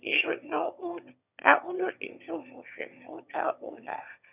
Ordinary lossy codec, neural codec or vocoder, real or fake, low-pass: none; autoencoder, 22.05 kHz, a latent of 192 numbers a frame, VITS, trained on one speaker; fake; 3.6 kHz